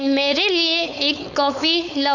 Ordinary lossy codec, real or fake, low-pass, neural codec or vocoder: none; fake; 7.2 kHz; codec, 16 kHz, 4.8 kbps, FACodec